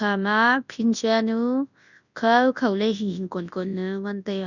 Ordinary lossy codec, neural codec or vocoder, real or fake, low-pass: none; codec, 24 kHz, 0.9 kbps, WavTokenizer, large speech release; fake; 7.2 kHz